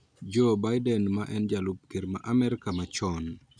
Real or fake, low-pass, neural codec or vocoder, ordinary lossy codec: real; 9.9 kHz; none; none